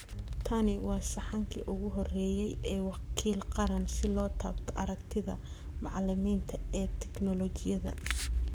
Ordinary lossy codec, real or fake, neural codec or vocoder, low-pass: none; fake; codec, 44.1 kHz, 7.8 kbps, Pupu-Codec; none